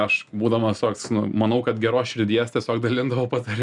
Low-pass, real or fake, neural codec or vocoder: 10.8 kHz; real; none